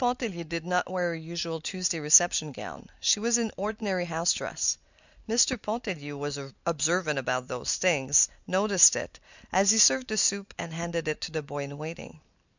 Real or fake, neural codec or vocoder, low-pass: real; none; 7.2 kHz